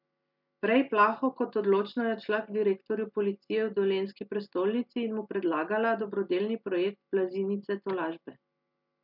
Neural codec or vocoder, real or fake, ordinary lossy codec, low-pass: none; real; MP3, 48 kbps; 5.4 kHz